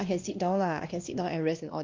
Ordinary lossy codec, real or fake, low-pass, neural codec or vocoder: Opus, 24 kbps; fake; 7.2 kHz; codec, 16 kHz, 1 kbps, X-Codec, WavLM features, trained on Multilingual LibriSpeech